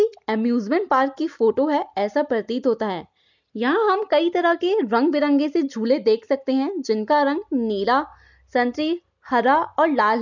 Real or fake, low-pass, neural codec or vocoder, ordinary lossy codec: real; 7.2 kHz; none; none